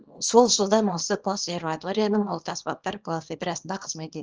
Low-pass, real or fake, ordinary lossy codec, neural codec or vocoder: 7.2 kHz; fake; Opus, 24 kbps; codec, 24 kHz, 0.9 kbps, WavTokenizer, small release